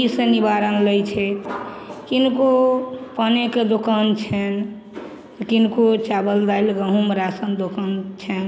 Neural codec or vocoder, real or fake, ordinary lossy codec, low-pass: none; real; none; none